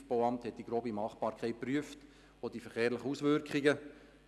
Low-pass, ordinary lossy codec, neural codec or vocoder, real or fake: none; none; none; real